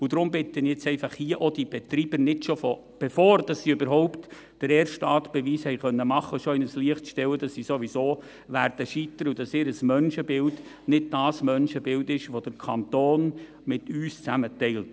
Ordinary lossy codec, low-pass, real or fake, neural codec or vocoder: none; none; real; none